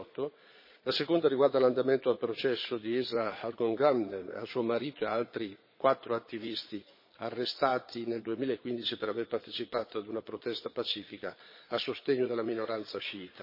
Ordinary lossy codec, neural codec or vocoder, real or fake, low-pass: MP3, 24 kbps; vocoder, 22.05 kHz, 80 mel bands, Vocos; fake; 5.4 kHz